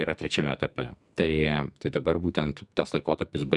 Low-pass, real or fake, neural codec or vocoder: 10.8 kHz; fake; codec, 44.1 kHz, 2.6 kbps, SNAC